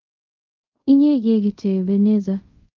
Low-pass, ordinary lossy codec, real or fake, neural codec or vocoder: 7.2 kHz; Opus, 32 kbps; fake; codec, 24 kHz, 0.5 kbps, DualCodec